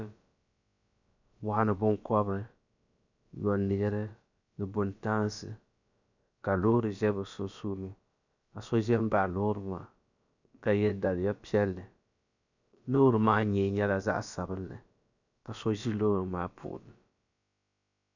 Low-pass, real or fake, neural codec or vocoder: 7.2 kHz; fake; codec, 16 kHz, about 1 kbps, DyCAST, with the encoder's durations